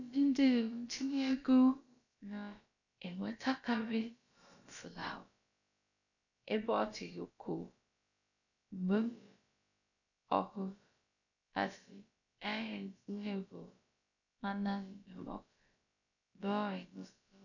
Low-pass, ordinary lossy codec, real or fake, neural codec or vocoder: 7.2 kHz; none; fake; codec, 16 kHz, about 1 kbps, DyCAST, with the encoder's durations